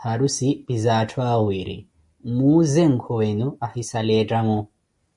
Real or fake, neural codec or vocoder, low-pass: real; none; 10.8 kHz